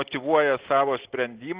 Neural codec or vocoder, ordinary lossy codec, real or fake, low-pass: none; Opus, 16 kbps; real; 3.6 kHz